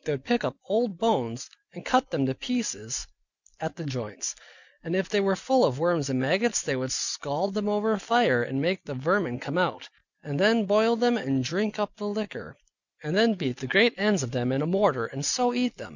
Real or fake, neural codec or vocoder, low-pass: real; none; 7.2 kHz